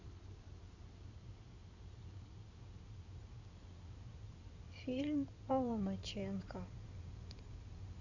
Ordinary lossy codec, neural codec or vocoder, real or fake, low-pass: none; codec, 16 kHz in and 24 kHz out, 2.2 kbps, FireRedTTS-2 codec; fake; 7.2 kHz